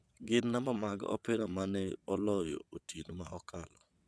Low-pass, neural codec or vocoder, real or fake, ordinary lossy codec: none; vocoder, 22.05 kHz, 80 mel bands, WaveNeXt; fake; none